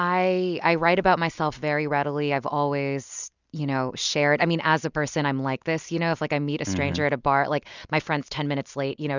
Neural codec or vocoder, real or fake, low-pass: none; real; 7.2 kHz